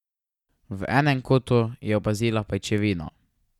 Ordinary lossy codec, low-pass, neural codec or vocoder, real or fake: none; 19.8 kHz; none; real